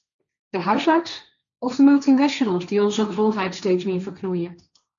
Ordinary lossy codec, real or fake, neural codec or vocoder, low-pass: MP3, 96 kbps; fake; codec, 16 kHz, 1.1 kbps, Voila-Tokenizer; 7.2 kHz